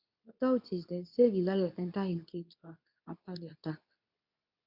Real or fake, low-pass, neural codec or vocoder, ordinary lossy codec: fake; 5.4 kHz; codec, 24 kHz, 0.9 kbps, WavTokenizer, medium speech release version 2; none